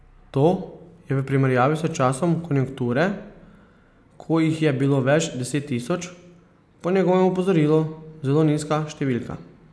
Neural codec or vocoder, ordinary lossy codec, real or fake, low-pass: none; none; real; none